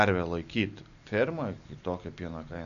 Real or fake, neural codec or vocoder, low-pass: real; none; 7.2 kHz